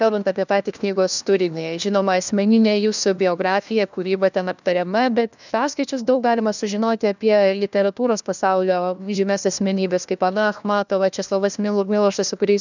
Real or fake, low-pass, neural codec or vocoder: fake; 7.2 kHz; codec, 16 kHz, 1 kbps, FunCodec, trained on LibriTTS, 50 frames a second